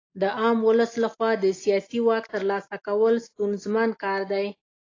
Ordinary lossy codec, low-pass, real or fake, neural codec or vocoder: AAC, 32 kbps; 7.2 kHz; real; none